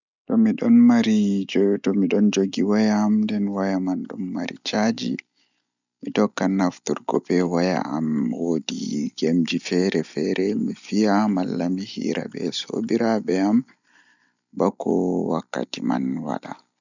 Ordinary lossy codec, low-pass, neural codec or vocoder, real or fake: none; 7.2 kHz; none; real